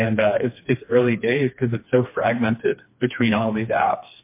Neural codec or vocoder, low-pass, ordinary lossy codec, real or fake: codec, 16 kHz, 2 kbps, FreqCodec, smaller model; 3.6 kHz; MP3, 24 kbps; fake